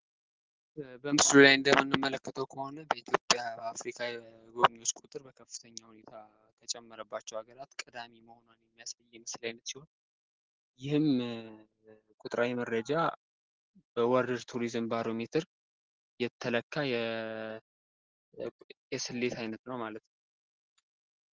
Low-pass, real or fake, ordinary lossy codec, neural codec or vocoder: 7.2 kHz; real; Opus, 16 kbps; none